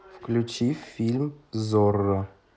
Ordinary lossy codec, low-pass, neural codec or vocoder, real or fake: none; none; none; real